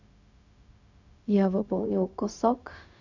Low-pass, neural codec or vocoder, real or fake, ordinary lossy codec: 7.2 kHz; codec, 16 kHz, 0.4 kbps, LongCat-Audio-Codec; fake; none